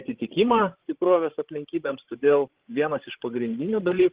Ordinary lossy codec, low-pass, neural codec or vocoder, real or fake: Opus, 16 kbps; 3.6 kHz; codec, 16 kHz, 16 kbps, FreqCodec, larger model; fake